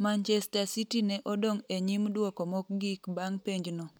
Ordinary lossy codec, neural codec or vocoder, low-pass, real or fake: none; none; none; real